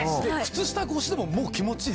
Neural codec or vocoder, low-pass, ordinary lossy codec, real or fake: none; none; none; real